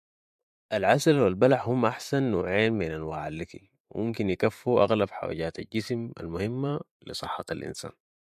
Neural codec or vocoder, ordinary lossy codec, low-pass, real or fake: vocoder, 44.1 kHz, 128 mel bands every 512 samples, BigVGAN v2; MP3, 64 kbps; 14.4 kHz; fake